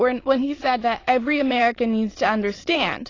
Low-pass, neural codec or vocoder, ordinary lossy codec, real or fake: 7.2 kHz; autoencoder, 22.05 kHz, a latent of 192 numbers a frame, VITS, trained on many speakers; AAC, 32 kbps; fake